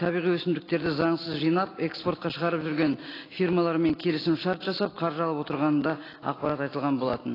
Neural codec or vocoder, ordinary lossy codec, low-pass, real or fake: none; AAC, 24 kbps; 5.4 kHz; real